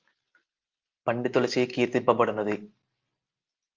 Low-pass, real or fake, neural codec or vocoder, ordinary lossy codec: 7.2 kHz; real; none; Opus, 32 kbps